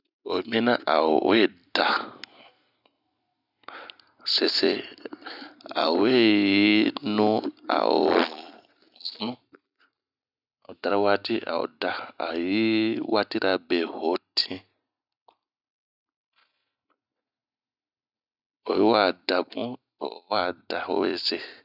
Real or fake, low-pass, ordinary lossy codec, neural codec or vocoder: real; 5.4 kHz; none; none